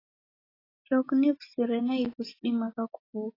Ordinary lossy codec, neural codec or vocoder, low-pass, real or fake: AAC, 24 kbps; none; 5.4 kHz; real